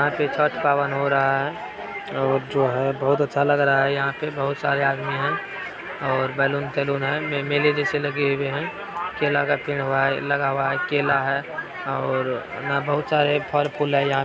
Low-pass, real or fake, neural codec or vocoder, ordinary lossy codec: none; real; none; none